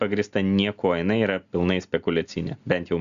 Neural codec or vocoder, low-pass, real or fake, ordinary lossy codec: none; 7.2 kHz; real; Opus, 64 kbps